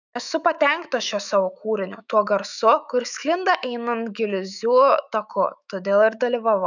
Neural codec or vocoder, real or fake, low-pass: autoencoder, 48 kHz, 128 numbers a frame, DAC-VAE, trained on Japanese speech; fake; 7.2 kHz